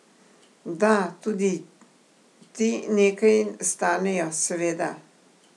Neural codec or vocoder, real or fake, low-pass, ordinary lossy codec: none; real; none; none